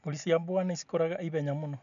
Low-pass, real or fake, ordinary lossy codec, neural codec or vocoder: 7.2 kHz; real; none; none